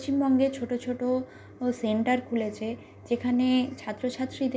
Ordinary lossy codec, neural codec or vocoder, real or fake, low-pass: none; none; real; none